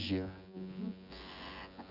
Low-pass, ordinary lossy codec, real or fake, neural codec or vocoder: 5.4 kHz; none; fake; vocoder, 24 kHz, 100 mel bands, Vocos